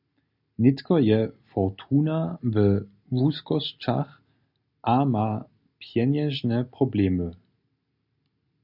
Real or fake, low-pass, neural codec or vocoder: real; 5.4 kHz; none